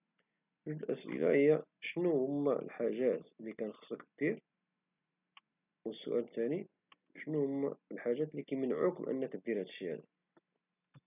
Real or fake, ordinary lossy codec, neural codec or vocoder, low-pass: real; none; none; 3.6 kHz